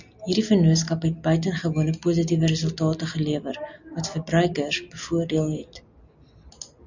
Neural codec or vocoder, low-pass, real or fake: none; 7.2 kHz; real